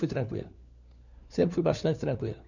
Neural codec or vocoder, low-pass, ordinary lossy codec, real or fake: codec, 16 kHz, 4 kbps, FunCodec, trained on LibriTTS, 50 frames a second; 7.2 kHz; none; fake